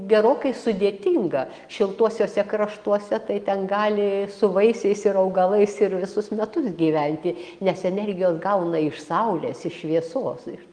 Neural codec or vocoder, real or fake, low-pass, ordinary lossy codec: none; real; 9.9 kHz; Opus, 32 kbps